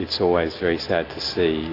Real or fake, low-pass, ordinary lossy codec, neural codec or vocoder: real; 5.4 kHz; AAC, 32 kbps; none